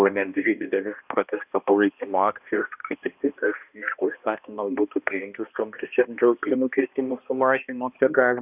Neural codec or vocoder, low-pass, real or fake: codec, 16 kHz, 1 kbps, X-Codec, HuBERT features, trained on balanced general audio; 3.6 kHz; fake